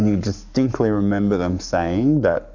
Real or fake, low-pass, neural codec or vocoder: fake; 7.2 kHz; codec, 44.1 kHz, 7.8 kbps, Pupu-Codec